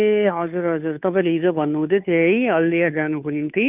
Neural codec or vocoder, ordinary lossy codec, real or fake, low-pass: codec, 16 kHz, 2 kbps, FunCodec, trained on Chinese and English, 25 frames a second; none; fake; 3.6 kHz